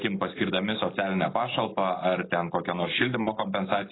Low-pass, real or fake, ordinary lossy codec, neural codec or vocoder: 7.2 kHz; real; AAC, 16 kbps; none